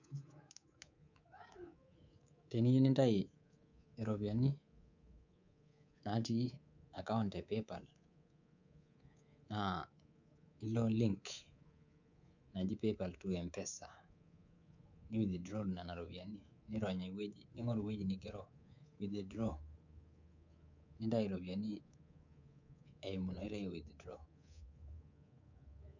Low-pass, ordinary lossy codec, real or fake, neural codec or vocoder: 7.2 kHz; none; fake; codec, 24 kHz, 3.1 kbps, DualCodec